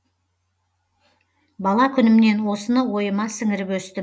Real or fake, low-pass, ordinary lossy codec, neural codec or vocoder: real; none; none; none